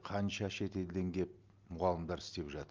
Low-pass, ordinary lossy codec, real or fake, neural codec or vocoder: 7.2 kHz; Opus, 24 kbps; real; none